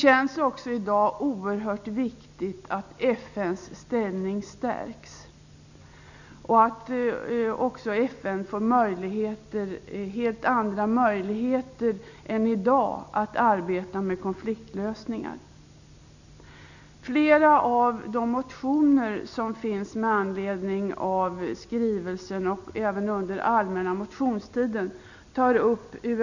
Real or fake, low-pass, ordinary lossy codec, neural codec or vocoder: real; 7.2 kHz; none; none